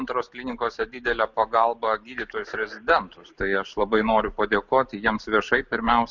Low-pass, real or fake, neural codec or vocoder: 7.2 kHz; real; none